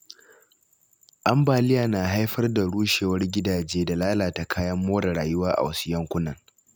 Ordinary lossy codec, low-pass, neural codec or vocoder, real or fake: none; none; none; real